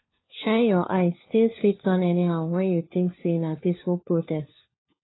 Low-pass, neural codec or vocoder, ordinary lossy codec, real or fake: 7.2 kHz; codec, 16 kHz, 4 kbps, FunCodec, trained on LibriTTS, 50 frames a second; AAC, 16 kbps; fake